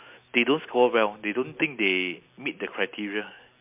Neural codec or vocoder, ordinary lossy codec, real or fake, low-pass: none; MP3, 32 kbps; real; 3.6 kHz